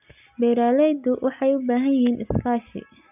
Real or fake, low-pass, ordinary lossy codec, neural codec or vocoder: real; 3.6 kHz; none; none